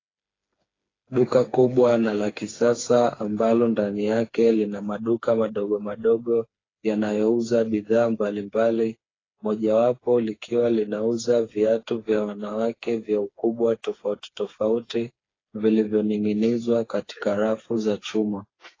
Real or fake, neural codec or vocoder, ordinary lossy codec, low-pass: fake; codec, 16 kHz, 4 kbps, FreqCodec, smaller model; AAC, 32 kbps; 7.2 kHz